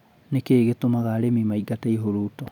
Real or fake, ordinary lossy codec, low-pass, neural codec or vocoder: real; none; 19.8 kHz; none